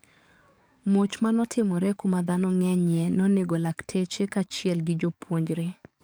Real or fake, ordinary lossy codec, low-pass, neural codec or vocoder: fake; none; none; codec, 44.1 kHz, 7.8 kbps, DAC